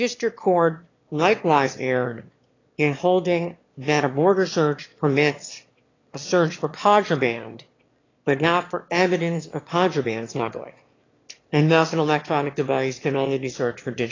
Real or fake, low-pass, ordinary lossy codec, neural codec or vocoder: fake; 7.2 kHz; AAC, 32 kbps; autoencoder, 22.05 kHz, a latent of 192 numbers a frame, VITS, trained on one speaker